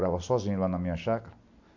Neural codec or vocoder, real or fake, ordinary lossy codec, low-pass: none; real; AAC, 48 kbps; 7.2 kHz